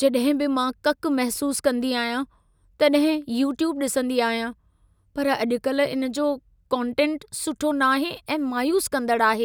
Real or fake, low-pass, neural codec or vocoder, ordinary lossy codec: real; none; none; none